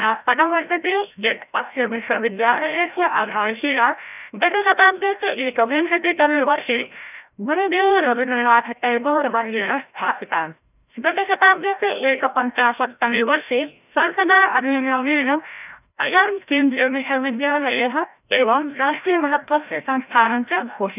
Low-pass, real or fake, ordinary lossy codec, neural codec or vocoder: 3.6 kHz; fake; none; codec, 16 kHz, 0.5 kbps, FreqCodec, larger model